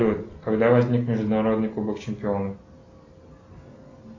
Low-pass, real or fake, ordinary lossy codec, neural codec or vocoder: 7.2 kHz; real; AAC, 32 kbps; none